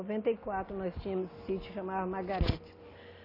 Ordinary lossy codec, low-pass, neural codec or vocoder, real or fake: AAC, 24 kbps; 5.4 kHz; none; real